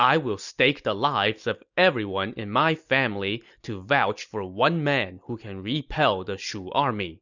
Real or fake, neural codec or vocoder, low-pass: real; none; 7.2 kHz